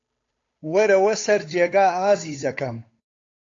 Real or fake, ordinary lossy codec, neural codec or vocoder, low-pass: fake; AAC, 48 kbps; codec, 16 kHz, 8 kbps, FunCodec, trained on Chinese and English, 25 frames a second; 7.2 kHz